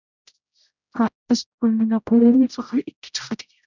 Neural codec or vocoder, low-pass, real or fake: codec, 16 kHz, 0.5 kbps, X-Codec, HuBERT features, trained on general audio; 7.2 kHz; fake